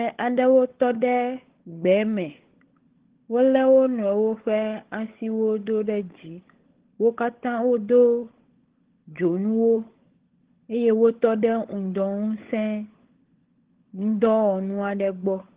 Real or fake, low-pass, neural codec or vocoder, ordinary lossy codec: fake; 3.6 kHz; codec, 16 kHz, 16 kbps, FunCodec, trained on LibriTTS, 50 frames a second; Opus, 16 kbps